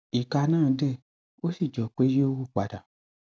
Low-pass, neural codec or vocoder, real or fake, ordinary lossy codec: none; none; real; none